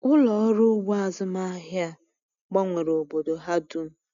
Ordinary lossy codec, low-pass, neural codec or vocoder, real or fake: none; 7.2 kHz; none; real